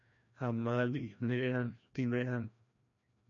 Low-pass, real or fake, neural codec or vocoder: 7.2 kHz; fake; codec, 16 kHz, 1 kbps, FreqCodec, larger model